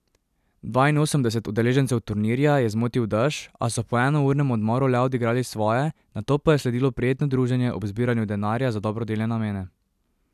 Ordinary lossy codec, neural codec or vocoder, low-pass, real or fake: none; none; 14.4 kHz; real